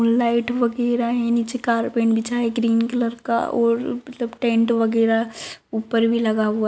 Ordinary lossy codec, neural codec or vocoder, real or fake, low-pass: none; none; real; none